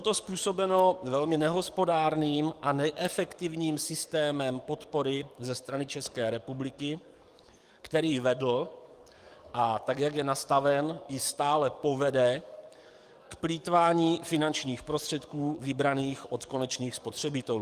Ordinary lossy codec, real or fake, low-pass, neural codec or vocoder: Opus, 32 kbps; fake; 14.4 kHz; codec, 44.1 kHz, 7.8 kbps, DAC